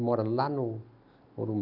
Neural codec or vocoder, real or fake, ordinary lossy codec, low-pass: none; real; none; 5.4 kHz